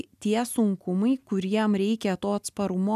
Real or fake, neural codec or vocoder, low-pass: real; none; 14.4 kHz